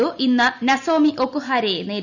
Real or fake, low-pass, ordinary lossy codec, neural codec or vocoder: real; 7.2 kHz; none; none